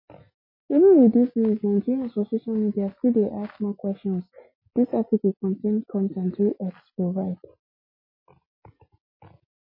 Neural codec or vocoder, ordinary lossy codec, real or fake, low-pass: none; MP3, 24 kbps; real; 5.4 kHz